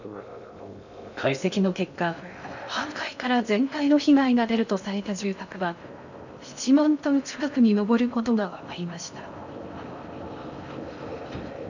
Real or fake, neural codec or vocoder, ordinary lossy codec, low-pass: fake; codec, 16 kHz in and 24 kHz out, 0.6 kbps, FocalCodec, streaming, 2048 codes; none; 7.2 kHz